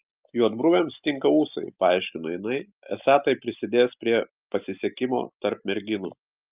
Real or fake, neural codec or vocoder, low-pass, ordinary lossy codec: real; none; 3.6 kHz; Opus, 24 kbps